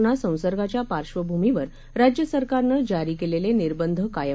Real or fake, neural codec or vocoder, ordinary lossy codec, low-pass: real; none; none; none